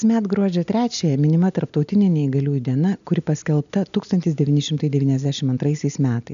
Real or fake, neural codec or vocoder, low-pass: real; none; 7.2 kHz